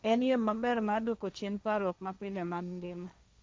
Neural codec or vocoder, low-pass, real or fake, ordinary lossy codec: codec, 16 kHz, 1.1 kbps, Voila-Tokenizer; none; fake; none